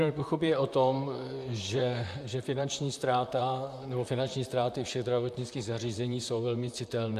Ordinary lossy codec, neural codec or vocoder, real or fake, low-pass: AAC, 96 kbps; vocoder, 44.1 kHz, 128 mel bands, Pupu-Vocoder; fake; 14.4 kHz